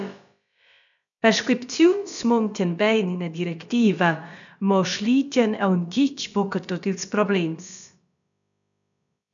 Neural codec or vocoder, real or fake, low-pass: codec, 16 kHz, about 1 kbps, DyCAST, with the encoder's durations; fake; 7.2 kHz